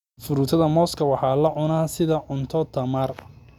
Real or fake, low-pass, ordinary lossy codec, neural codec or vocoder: real; 19.8 kHz; none; none